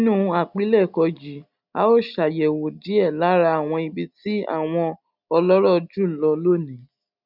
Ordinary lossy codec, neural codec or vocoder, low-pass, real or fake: none; none; 5.4 kHz; real